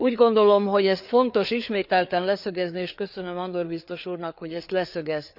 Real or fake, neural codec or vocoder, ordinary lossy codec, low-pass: fake; codec, 16 kHz, 4 kbps, FreqCodec, larger model; none; 5.4 kHz